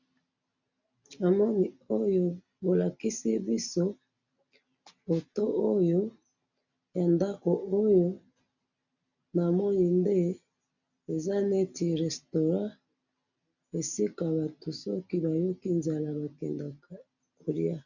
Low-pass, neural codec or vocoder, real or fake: 7.2 kHz; none; real